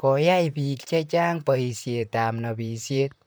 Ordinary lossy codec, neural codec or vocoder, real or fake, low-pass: none; vocoder, 44.1 kHz, 128 mel bands, Pupu-Vocoder; fake; none